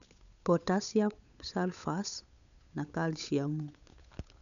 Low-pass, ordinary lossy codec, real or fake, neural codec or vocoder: 7.2 kHz; none; fake; codec, 16 kHz, 8 kbps, FunCodec, trained on Chinese and English, 25 frames a second